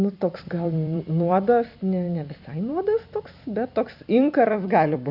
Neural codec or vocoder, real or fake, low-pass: vocoder, 44.1 kHz, 128 mel bands every 256 samples, BigVGAN v2; fake; 5.4 kHz